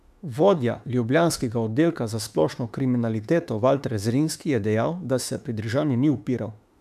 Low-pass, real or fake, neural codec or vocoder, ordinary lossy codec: 14.4 kHz; fake; autoencoder, 48 kHz, 32 numbers a frame, DAC-VAE, trained on Japanese speech; none